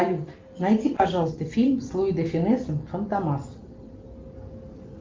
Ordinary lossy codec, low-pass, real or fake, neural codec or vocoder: Opus, 32 kbps; 7.2 kHz; real; none